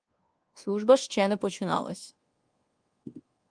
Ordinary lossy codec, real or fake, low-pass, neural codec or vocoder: Opus, 24 kbps; fake; 9.9 kHz; codec, 24 kHz, 1.2 kbps, DualCodec